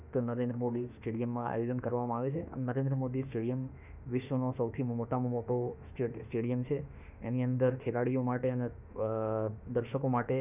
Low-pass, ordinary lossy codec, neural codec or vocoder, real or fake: 3.6 kHz; none; autoencoder, 48 kHz, 32 numbers a frame, DAC-VAE, trained on Japanese speech; fake